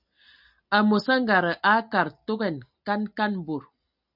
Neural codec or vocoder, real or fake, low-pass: none; real; 5.4 kHz